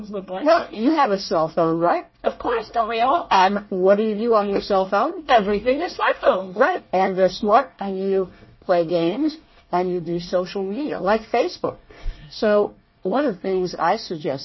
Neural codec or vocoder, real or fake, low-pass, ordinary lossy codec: codec, 24 kHz, 1 kbps, SNAC; fake; 7.2 kHz; MP3, 24 kbps